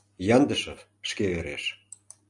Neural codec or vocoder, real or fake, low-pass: none; real; 10.8 kHz